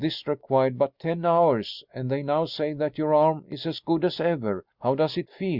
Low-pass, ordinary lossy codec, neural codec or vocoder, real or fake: 5.4 kHz; Opus, 64 kbps; none; real